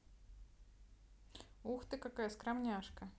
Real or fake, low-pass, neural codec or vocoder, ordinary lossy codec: real; none; none; none